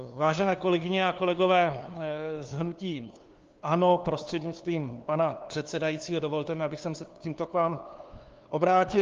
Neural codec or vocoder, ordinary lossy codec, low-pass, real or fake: codec, 16 kHz, 2 kbps, FunCodec, trained on LibriTTS, 25 frames a second; Opus, 32 kbps; 7.2 kHz; fake